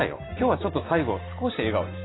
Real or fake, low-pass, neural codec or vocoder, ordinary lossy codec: real; 7.2 kHz; none; AAC, 16 kbps